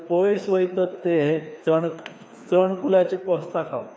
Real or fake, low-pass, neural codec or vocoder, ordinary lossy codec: fake; none; codec, 16 kHz, 2 kbps, FreqCodec, larger model; none